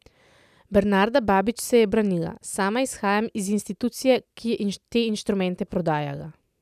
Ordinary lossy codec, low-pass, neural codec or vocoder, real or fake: none; 14.4 kHz; none; real